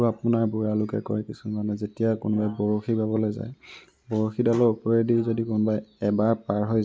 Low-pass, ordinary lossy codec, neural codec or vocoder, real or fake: none; none; none; real